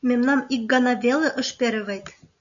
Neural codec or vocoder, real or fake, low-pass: none; real; 7.2 kHz